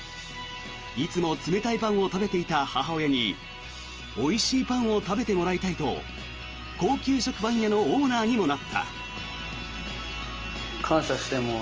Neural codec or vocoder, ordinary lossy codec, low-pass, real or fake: none; Opus, 24 kbps; 7.2 kHz; real